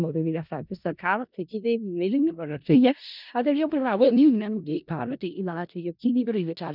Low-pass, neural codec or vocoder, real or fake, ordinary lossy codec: 5.4 kHz; codec, 16 kHz in and 24 kHz out, 0.4 kbps, LongCat-Audio-Codec, four codebook decoder; fake; none